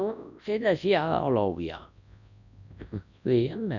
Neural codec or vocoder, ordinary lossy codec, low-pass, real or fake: codec, 24 kHz, 0.9 kbps, WavTokenizer, large speech release; none; 7.2 kHz; fake